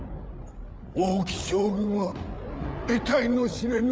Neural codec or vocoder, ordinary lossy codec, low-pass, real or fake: codec, 16 kHz, 8 kbps, FreqCodec, larger model; none; none; fake